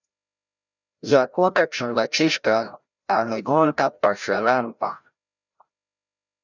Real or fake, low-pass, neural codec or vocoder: fake; 7.2 kHz; codec, 16 kHz, 0.5 kbps, FreqCodec, larger model